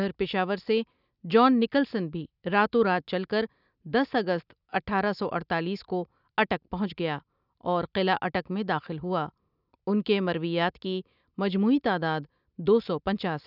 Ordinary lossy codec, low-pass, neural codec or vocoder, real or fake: none; 5.4 kHz; none; real